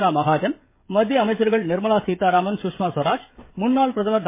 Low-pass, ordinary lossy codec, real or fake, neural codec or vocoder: 3.6 kHz; MP3, 24 kbps; fake; codec, 16 kHz, 16 kbps, FreqCodec, smaller model